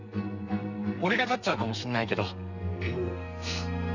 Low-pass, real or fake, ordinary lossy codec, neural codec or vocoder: 7.2 kHz; fake; none; codec, 32 kHz, 1.9 kbps, SNAC